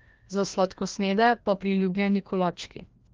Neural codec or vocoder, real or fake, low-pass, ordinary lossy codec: codec, 16 kHz, 1 kbps, FreqCodec, larger model; fake; 7.2 kHz; Opus, 32 kbps